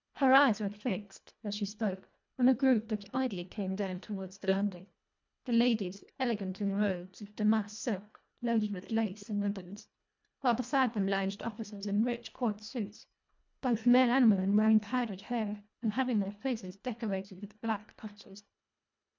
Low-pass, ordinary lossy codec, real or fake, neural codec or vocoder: 7.2 kHz; MP3, 64 kbps; fake; codec, 24 kHz, 1.5 kbps, HILCodec